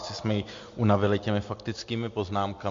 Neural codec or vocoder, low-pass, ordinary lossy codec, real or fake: none; 7.2 kHz; MP3, 48 kbps; real